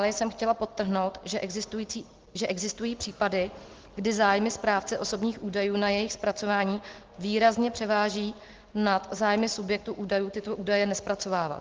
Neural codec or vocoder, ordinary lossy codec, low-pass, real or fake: none; Opus, 16 kbps; 7.2 kHz; real